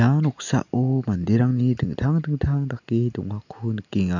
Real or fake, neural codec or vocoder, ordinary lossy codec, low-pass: real; none; none; 7.2 kHz